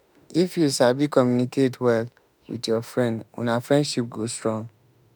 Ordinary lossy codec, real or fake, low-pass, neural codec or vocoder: none; fake; none; autoencoder, 48 kHz, 32 numbers a frame, DAC-VAE, trained on Japanese speech